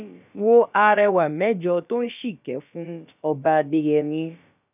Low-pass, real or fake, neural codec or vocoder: 3.6 kHz; fake; codec, 16 kHz, about 1 kbps, DyCAST, with the encoder's durations